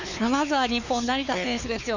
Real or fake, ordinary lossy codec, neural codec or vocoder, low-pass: fake; none; codec, 16 kHz, 4 kbps, X-Codec, WavLM features, trained on Multilingual LibriSpeech; 7.2 kHz